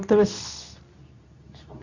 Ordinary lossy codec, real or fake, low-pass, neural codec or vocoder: none; fake; 7.2 kHz; codec, 24 kHz, 0.9 kbps, WavTokenizer, medium speech release version 2